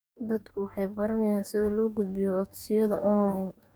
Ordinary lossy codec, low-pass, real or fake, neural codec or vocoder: none; none; fake; codec, 44.1 kHz, 2.6 kbps, DAC